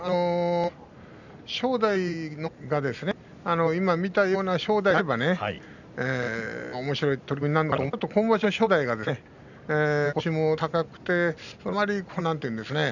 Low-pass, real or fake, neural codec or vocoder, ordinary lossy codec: 7.2 kHz; real; none; none